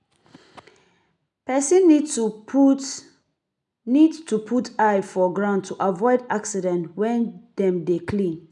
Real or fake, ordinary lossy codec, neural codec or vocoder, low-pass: real; none; none; 10.8 kHz